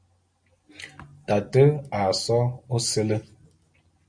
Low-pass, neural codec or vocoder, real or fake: 9.9 kHz; none; real